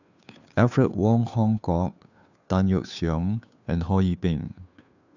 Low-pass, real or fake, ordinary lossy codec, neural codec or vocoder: 7.2 kHz; fake; none; codec, 16 kHz, 2 kbps, FunCodec, trained on Chinese and English, 25 frames a second